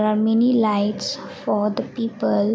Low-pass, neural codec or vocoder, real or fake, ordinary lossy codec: none; none; real; none